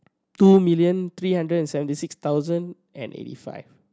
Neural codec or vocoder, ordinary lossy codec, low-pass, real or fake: none; none; none; real